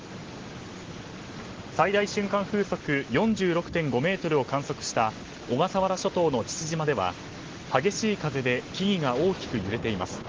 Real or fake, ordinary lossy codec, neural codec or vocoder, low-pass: real; Opus, 16 kbps; none; 7.2 kHz